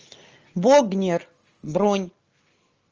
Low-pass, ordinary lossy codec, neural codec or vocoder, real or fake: 7.2 kHz; Opus, 24 kbps; none; real